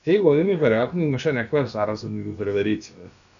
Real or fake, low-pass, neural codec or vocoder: fake; 7.2 kHz; codec, 16 kHz, about 1 kbps, DyCAST, with the encoder's durations